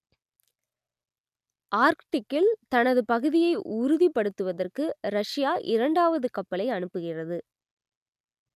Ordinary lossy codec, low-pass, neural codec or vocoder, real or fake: none; 14.4 kHz; none; real